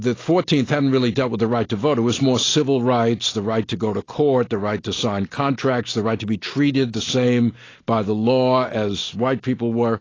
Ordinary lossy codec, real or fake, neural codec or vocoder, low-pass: AAC, 32 kbps; real; none; 7.2 kHz